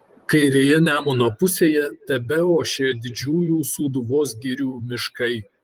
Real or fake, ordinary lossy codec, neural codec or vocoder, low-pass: fake; Opus, 24 kbps; vocoder, 44.1 kHz, 128 mel bands, Pupu-Vocoder; 19.8 kHz